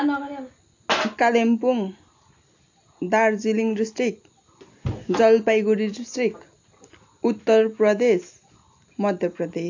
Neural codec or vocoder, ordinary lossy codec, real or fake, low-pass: none; AAC, 48 kbps; real; 7.2 kHz